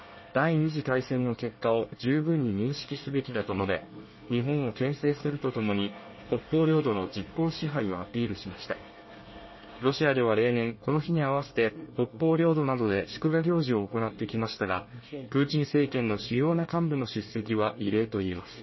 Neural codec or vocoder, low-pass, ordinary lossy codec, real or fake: codec, 24 kHz, 1 kbps, SNAC; 7.2 kHz; MP3, 24 kbps; fake